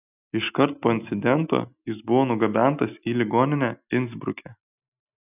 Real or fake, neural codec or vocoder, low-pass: real; none; 3.6 kHz